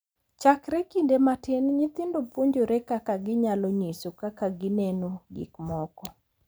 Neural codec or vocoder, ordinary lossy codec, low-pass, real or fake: vocoder, 44.1 kHz, 128 mel bands every 512 samples, BigVGAN v2; none; none; fake